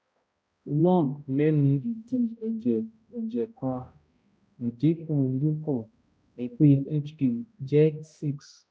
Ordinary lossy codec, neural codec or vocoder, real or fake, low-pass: none; codec, 16 kHz, 0.5 kbps, X-Codec, HuBERT features, trained on balanced general audio; fake; none